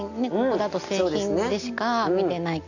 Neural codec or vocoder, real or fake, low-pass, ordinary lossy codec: none; real; 7.2 kHz; none